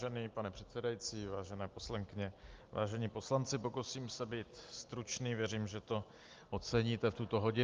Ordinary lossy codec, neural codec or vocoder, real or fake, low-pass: Opus, 32 kbps; none; real; 7.2 kHz